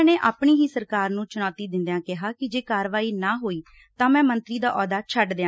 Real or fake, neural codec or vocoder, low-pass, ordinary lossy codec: real; none; none; none